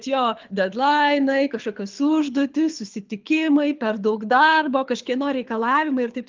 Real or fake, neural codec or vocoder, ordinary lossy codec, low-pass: fake; codec, 24 kHz, 6 kbps, HILCodec; Opus, 24 kbps; 7.2 kHz